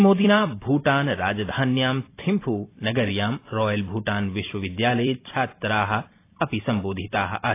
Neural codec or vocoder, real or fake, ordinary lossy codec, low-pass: vocoder, 44.1 kHz, 128 mel bands every 256 samples, BigVGAN v2; fake; AAC, 24 kbps; 3.6 kHz